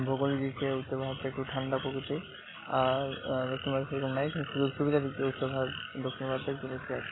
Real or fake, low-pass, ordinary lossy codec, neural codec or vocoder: real; 7.2 kHz; AAC, 16 kbps; none